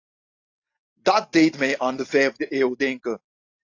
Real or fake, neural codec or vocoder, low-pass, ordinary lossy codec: real; none; 7.2 kHz; AAC, 48 kbps